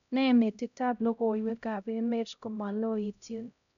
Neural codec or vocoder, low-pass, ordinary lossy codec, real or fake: codec, 16 kHz, 0.5 kbps, X-Codec, HuBERT features, trained on LibriSpeech; 7.2 kHz; none; fake